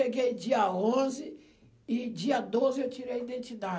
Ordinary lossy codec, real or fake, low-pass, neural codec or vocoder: none; real; none; none